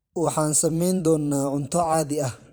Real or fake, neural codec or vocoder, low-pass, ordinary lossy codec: fake; vocoder, 44.1 kHz, 128 mel bands every 512 samples, BigVGAN v2; none; none